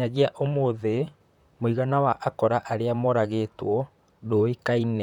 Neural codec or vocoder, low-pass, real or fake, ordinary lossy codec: vocoder, 44.1 kHz, 128 mel bands, Pupu-Vocoder; 19.8 kHz; fake; none